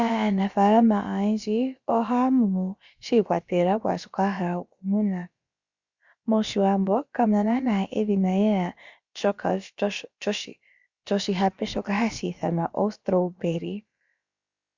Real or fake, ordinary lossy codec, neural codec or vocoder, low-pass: fake; Opus, 64 kbps; codec, 16 kHz, about 1 kbps, DyCAST, with the encoder's durations; 7.2 kHz